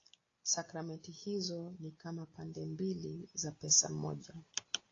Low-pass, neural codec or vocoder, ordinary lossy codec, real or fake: 7.2 kHz; none; AAC, 32 kbps; real